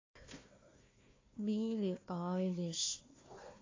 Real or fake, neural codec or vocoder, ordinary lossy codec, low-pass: fake; codec, 16 kHz, 1 kbps, FunCodec, trained on Chinese and English, 50 frames a second; AAC, 32 kbps; 7.2 kHz